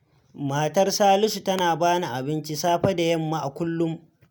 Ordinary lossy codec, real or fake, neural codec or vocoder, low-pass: none; real; none; none